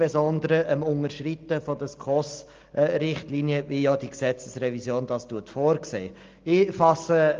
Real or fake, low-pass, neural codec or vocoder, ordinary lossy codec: real; 7.2 kHz; none; Opus, 32 kbps